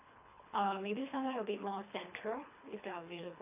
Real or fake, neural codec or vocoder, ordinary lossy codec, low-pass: fake; codec, 24 kHz, 3 kbps, HILCodec; AAC, 32 kbps; 3.6 kHz